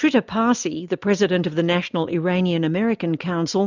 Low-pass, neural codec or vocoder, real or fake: 7.2 kHz; none; real